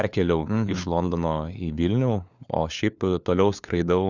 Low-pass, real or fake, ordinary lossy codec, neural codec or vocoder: 7.2 kHz; fake; Opus, 64 kbps; codec, 16 kHz, 4 kbps, FunCodec, trained on LibriTTS, 50 frames a second